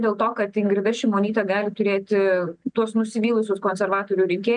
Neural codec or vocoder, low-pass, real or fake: none; 9.9 kHz; real